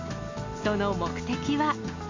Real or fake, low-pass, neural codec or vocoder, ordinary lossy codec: real; 7.2 kHz; none; MP3, 64 kbps